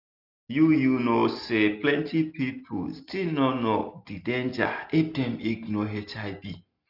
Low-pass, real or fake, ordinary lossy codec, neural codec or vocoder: 5.4 kHz; real; none; none